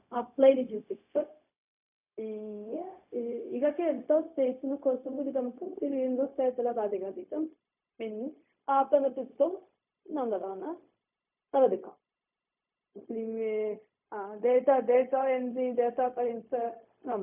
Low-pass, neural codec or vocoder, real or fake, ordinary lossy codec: 3.6 kHz; codec, 16 kHz, 0.4 kbps, LongCat-Audio-Codec; fake; none